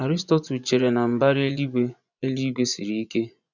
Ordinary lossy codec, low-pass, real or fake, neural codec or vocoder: none; 7.2 kHz; fake; vocoder, 24 kHz, 100 mel bands, Vocos